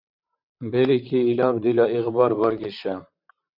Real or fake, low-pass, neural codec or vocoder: fake; 5.4 kHz; vocoder, 44.1 kHz, 128 mel bands, Pupu-Vocoder